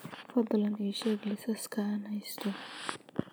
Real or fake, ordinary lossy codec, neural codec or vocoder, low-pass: real; none; none; none